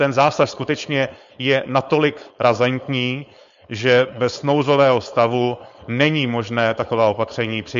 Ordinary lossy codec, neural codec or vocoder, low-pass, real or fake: MP3, 48 kbps; codec, 16 kHz, 4.8 kbps, FACodec; 7.2 kHz; fake